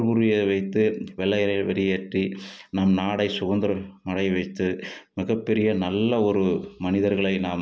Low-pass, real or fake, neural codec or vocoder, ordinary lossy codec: none; real; none; none